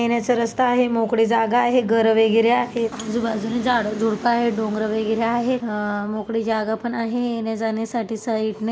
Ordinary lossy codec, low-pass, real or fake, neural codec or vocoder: none; none; real; none